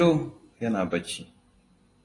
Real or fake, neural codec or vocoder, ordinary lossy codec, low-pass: real; none; AAC, 32 kbps; 10.8 kHz